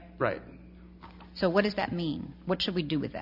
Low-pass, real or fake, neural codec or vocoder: 5.4 kHz; real; none